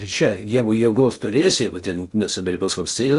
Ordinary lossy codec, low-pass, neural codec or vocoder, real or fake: Opus, 64 kbps; 10.8 kHz; codec, 16 kHz in and 24 kHz out, 0.6 kbps, FocalCodec, streaming, 2048 codes; fake